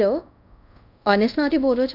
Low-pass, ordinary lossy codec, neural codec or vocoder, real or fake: 5.4 kHz; none; codec, 24 kHz, 0.5 kbps, DualCodec; fake